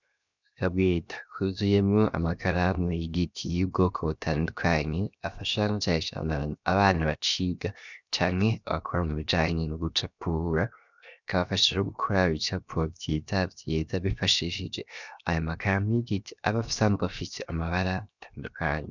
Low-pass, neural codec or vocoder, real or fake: 7.2 kHz; codec, 16 kHz, 0.7 kbps, FocalCodec; fake